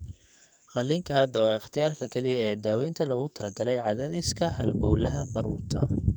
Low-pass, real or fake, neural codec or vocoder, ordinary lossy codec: none; fake; codec, 44.1 kHz, 2.6 kbps, SNAC; none